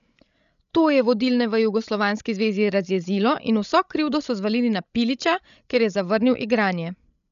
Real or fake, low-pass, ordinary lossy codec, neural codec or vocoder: fake; 7.2 kHz; none; codec, 16 kHz, 16 kbps, FreqCodec, larger model